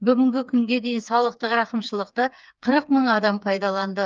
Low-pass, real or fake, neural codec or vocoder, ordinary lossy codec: 7.2 kHz; fake; codec, 16 kHz, 4 kbps, FreqCodec, smaller model; Opus, 32 kbps